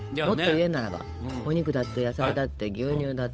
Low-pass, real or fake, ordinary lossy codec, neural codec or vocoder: none; fake; none; codec, 16 kHz, 8 kbps, FunCodec, trained on Chinese and English, 25 frames a second